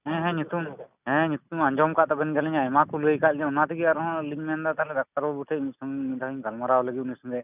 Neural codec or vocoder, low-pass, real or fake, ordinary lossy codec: none; 3.6 kHz; real; none